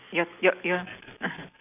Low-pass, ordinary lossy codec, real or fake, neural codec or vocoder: 3.6 kHz; none; real; none